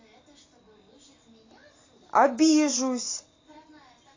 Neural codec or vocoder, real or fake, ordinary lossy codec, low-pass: none; real; AAC, 32 kbps; 7.2 kHz